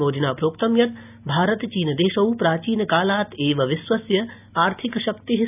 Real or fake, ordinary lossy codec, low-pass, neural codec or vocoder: real; none; 3.6 kHz; none